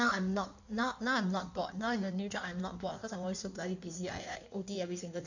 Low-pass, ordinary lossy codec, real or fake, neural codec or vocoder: 7.2 kHz; none; fake; codec, 16 kHz in and 24 kHz out, 1.1 kbps, FireRedTTS-2 codec